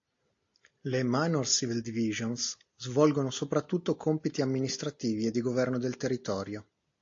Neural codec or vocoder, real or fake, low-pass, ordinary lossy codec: none; real; 7.2 kHz; AAC, 48 kbps